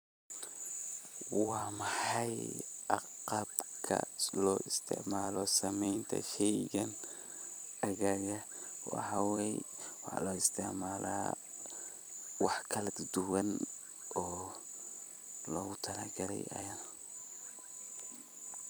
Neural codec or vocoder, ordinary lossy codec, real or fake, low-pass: vocoder, 44.1 kHz, 128 mel bands every 256 samples, BigVGAN v2; none; fake; none